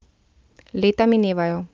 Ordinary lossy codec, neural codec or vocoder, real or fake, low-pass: Opus, 24 kbps; none; real; 7.2 kHz